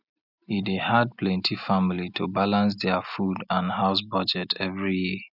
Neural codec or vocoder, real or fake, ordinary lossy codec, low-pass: none; real; none; 5.4 kHz